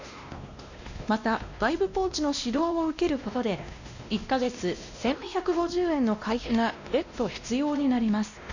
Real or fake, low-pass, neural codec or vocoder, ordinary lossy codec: fake; 7.2 kHz; codec, 16 kHz, 1 kbps, X-Codec, WavLM features, trained on Multilingual LibriSpeech; none